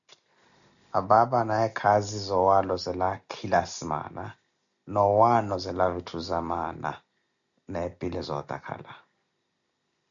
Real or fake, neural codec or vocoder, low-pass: real; none; 7.2 kHz